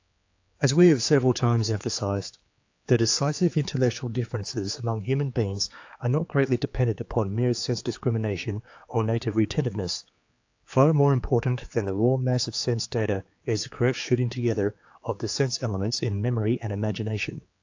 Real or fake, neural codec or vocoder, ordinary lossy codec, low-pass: fake; codec, 16 kHz, 4 kbps, X-Codec, HuBERT features, trained on general audio; AAC, 48 kbps; 7.2 kHz